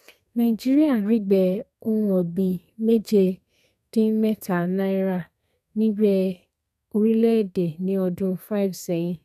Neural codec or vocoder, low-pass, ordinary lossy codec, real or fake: codec, 32 kHz, 1.9 kbps, SNAC; 14.4 kHz; none; fake